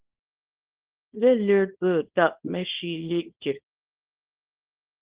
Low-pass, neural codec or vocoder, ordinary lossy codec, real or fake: 3.6 kHz; codec, 24 kHz, 0.9 kbps, WavTokenizer, small release; Opus, 16 kbps; fake